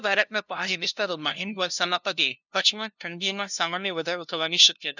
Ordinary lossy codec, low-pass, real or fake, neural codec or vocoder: none; 7.2 kHz; fake; codec, 16 kHz, 0.5 kbps, FunCodec, trained on LibriTTS, 25 frames a second